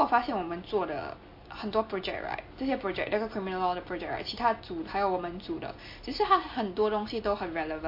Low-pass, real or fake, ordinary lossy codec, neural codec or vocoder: 5.4 kHz; real; MP3, 32 kbps; none